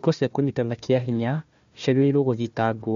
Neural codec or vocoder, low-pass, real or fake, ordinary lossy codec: codec, 16 kHz, 1 kbps, FunCodec, trained on Chinese and English, 50 frames a second; 7.2 kHz; fake; MP3, 48 kbps